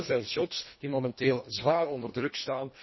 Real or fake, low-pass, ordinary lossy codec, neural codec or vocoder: fake; 7.2 kHz; MP3, 24 kbps; codec, 24 kHz, 1.5 kbps, HILCodec